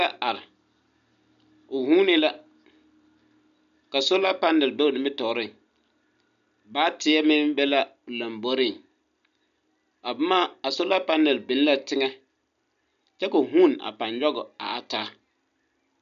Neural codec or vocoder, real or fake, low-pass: none; real; 7.2 kHz